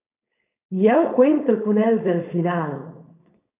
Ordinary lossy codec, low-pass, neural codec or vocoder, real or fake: AAC, 24 kbps; 3.6 kHz; codec, 16 kHz, 4.8 kbps, FACodec; fake